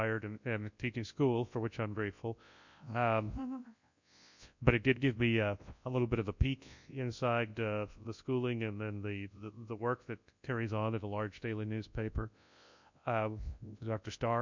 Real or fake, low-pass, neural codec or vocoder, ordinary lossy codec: fake; 7.2 kHz; codec, 24 kHz, 0.9 kbps, WavTokenizer, large speech release; MP3, 64 kbps